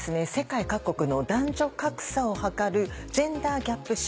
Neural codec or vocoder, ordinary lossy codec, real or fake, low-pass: none; none; real; none